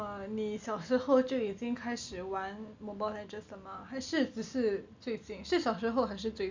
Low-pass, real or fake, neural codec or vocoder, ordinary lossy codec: 7.2 kHz; real; none; MP3, 64 kbps